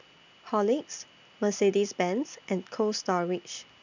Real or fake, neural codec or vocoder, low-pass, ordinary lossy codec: real; none; 7.2 kHz; none